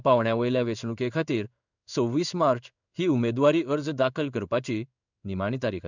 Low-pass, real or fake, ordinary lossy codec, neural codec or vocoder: 7.2 kHz; fake; none; codec, 16 kHz in and 24 kHz out, 1 kbps, XY-Tokenizer